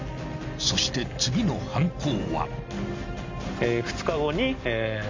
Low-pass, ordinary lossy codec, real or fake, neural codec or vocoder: 7.2 kHz; MP3, 48 kbps; real; none